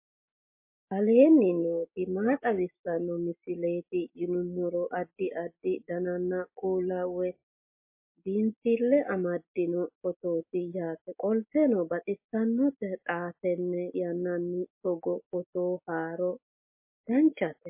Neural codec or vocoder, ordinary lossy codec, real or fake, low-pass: none; MP3, 24 kbps; real; 3.6 kHz